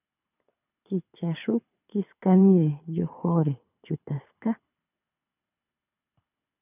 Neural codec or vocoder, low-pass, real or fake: codec, 24 kHz, 6 kbps, HILCodec; 3.6 kHz; fake